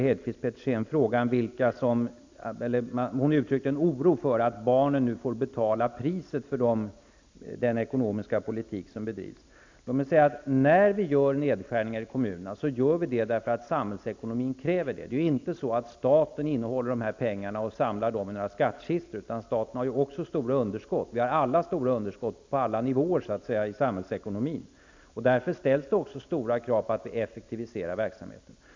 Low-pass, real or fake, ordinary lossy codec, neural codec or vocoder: 7.2 kHz; real; MP3, 64 kbps; none